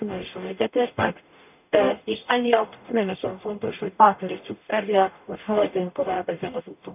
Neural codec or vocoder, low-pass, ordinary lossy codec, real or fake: codec, 44.1 kHz, 0.9 kbps, DAC; 3.6 kHz; none; fake